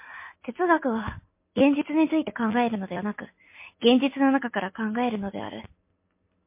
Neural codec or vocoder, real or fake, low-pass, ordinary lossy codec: none; real; 3.6 kHz; MP3, 24 kbps